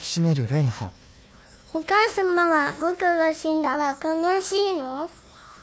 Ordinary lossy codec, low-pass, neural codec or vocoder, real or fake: none; none; codec, 16 kHz, 1 kbps, FunCodec, trained on Chinese and English, 50 frames a second; fake